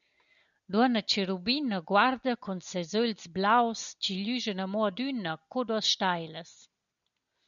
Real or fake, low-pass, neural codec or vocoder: real; 7.2 kHz; none